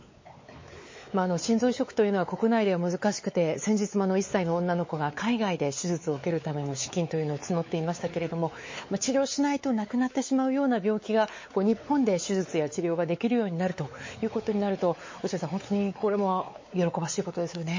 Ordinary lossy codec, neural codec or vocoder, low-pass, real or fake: MP3, 32 kbps; codec, 16 kHz, 4 kbps, X-Codec, WavLM features, trained on Multilingual LibriSpeech; 7.2 kHz; fake